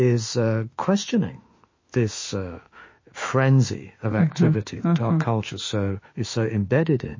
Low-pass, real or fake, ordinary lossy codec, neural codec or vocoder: 7.2 kHz; fake; MP3, 32 kbps; autoencoder, 48 kHz, 32 numbers a frame, DAC-VAE, trained on Japanese speech